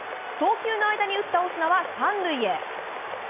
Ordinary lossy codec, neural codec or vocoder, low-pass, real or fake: none; none; 3.6 kHz; real